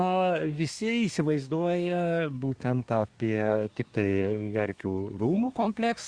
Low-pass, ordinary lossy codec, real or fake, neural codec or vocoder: 9.9 kHz; Opus, 64 kbps; fake; codec, 24 kHz, 1 kbps, SNAC